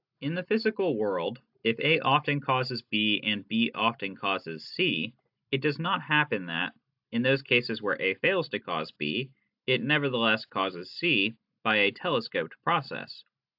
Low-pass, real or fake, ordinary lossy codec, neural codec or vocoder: 5.4 kHz; real; AAC, 48 kbps; none